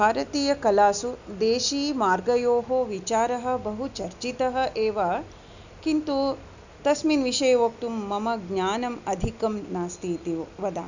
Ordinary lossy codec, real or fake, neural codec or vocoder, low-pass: none; real; none; 7.2 kHz